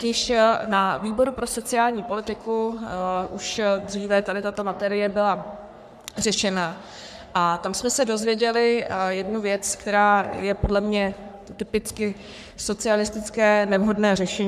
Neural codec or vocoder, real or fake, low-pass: codec, 44.1 kHz, 3.4 kbps, Pupu-Codec; fake; 14.4 kHz